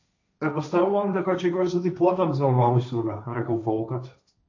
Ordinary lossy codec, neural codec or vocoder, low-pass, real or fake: AAC, 48 kbps; codec, 16 kHz, 1.1 kbps, Voila-Tokenizer; 7.2 kHz; fake